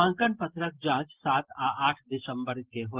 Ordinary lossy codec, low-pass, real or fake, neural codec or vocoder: Opus, 16 kbps; 3.6 kHz; real; none